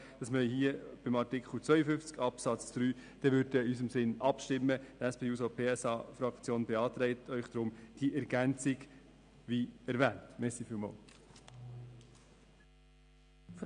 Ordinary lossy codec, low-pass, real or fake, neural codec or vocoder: none; 9.9 kHz; real; none